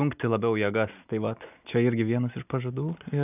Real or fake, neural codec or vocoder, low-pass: real; none; 3.6 kHz